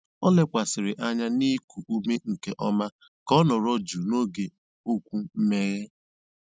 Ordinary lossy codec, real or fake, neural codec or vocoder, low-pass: none; real; none; none